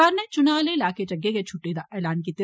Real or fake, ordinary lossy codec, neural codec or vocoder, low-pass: real; none; none; none